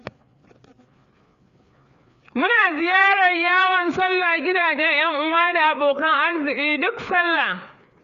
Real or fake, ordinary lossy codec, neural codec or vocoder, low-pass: fake; Opus, 64 kbps; codec, 16 kHz, 4 kbps, FreqCodec, larger model; 7.2 kHz